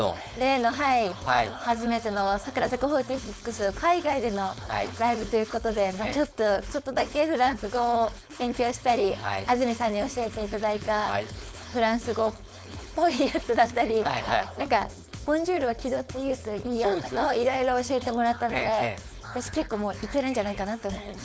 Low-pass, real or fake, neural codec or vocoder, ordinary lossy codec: none; fake; codec, 16 kHz, 4.8 kbps, FACodec; none